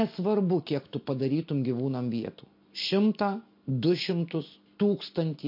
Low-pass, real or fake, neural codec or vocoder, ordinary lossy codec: 5.4 kHz; real; none; MP3, 32 kbps